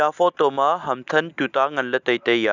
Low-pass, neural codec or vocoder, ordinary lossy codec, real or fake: 7.2 kHz; none; none; real